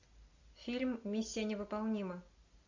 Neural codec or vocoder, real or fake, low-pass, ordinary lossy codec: none; real; 7.2 kHz; MP3, 64 kbps